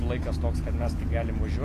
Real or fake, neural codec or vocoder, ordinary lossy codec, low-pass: real; none; AAC, 48 kbps; 14.4 kHz